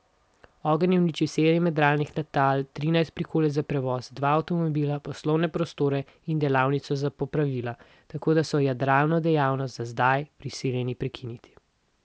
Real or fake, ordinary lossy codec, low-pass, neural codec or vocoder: real; none; none; none